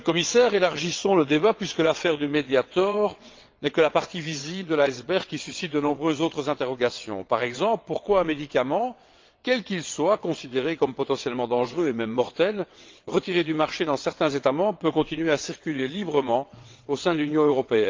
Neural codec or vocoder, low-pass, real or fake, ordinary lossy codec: vocoder, 22.05 kHz, 80 mel bands, WaveNeXt; 7.2 kHz; fake; Opus, 24 kbps